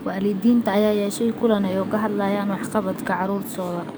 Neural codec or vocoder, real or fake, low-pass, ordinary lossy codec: codec, 44.1 kHz, 7.8 kbps, DAC; fake; none; none